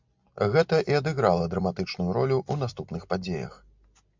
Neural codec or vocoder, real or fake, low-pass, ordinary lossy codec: none; real; 7.2 kHz; MP3, 64 kbps